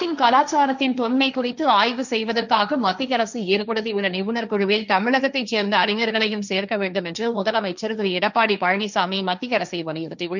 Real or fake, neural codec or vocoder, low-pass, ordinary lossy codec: fake; codec, 16 kHz, 1.1 kbps, Voila-Tokenizer; 7.2 kHz; none